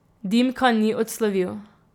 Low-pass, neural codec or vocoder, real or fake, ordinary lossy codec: 19.8 kHz; vocoder, 44.1 kHz, 128 mel bands every 256 samples, BigVGAN v2; fake; none